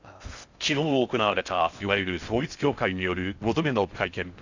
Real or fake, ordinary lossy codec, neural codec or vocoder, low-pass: fake; Opus, 64 kbps; codec, 16 kHz in and 24 kHz out, 0.6 kbps, FocalCodec, streaming, 4096 codes; 7.2 kHz